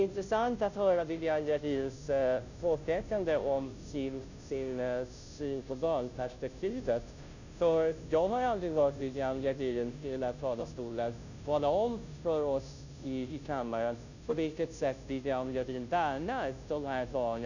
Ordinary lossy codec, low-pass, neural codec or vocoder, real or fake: none; 7.2 kHz; codec, 16 kHz, 0.5 kbps, FunCodec, trained on Chinese and English, 25 frames a second; fake